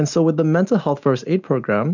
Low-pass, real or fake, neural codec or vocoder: 7.2 kHz; real; none